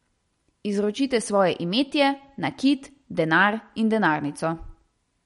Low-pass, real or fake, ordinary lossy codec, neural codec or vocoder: 19.8 kHz; real; MP3, 48 kbps; none